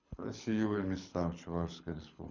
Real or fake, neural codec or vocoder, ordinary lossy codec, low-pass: fake; codec, 24 kHz, 6 kbps, HILCodec; none; 7.2 kHz